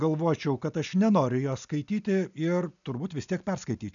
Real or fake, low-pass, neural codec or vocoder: real; 7.2 kHz; none